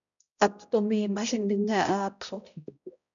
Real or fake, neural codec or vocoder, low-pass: fake; codec, 16 kHz, 0.5 kbps, X-Codec, HuBERT features, trained on balanced general audio; 7.2 kHz